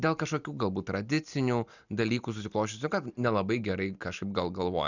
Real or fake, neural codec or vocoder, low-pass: real; none; 7.2 kHz